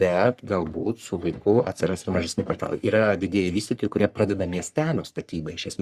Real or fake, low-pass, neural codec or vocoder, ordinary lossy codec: fake; 14.4 kHz; codec, 44.1 kHz, 3.4 kbps, Pupu-Codec; Opus, 64 kbps